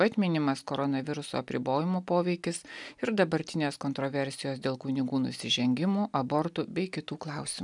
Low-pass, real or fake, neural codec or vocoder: 10.8 kHz; real; none